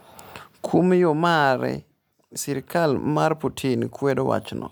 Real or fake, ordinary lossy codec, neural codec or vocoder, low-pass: real; none; none; none